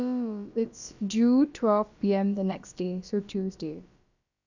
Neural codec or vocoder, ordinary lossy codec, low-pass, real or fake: codec, 16 kHz, about 1 kbps, DyCAST, with the encoder's durations; none; 7.2 kHz; fake